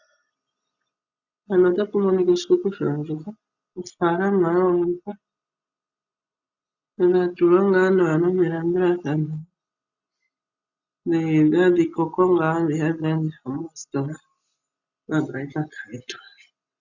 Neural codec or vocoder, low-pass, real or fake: none; 7.2 kHz; real